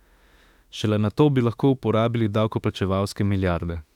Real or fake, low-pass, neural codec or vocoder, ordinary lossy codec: fake; 19.8 kHz; autoencoder, 48 kHz, 32 numbers a frame, DAC-VAE, trained on Japanese speech; none